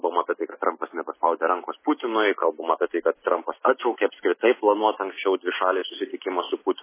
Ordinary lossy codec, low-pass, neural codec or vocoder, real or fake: MP3, 16 kbps; 3.6 kHz; none; real